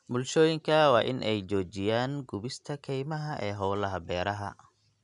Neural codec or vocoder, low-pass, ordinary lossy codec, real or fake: none; 10.8 kHz; none; real